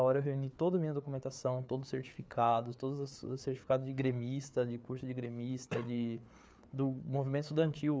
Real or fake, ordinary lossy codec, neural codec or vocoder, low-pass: fake; none; codec, 16 kHz, 4 kbps, FreqCodec, larger model; none